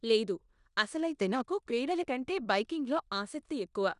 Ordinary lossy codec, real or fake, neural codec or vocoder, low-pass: none; fake; codec, 16 kHz in and 24 kHz out, 0.9 kbps, LongCat-Audio-Codec, four codebook decoder; 10.8 kHz